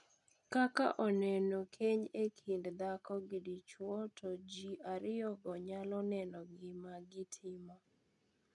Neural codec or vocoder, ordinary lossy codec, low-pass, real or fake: none; none; 10.8 kHz; real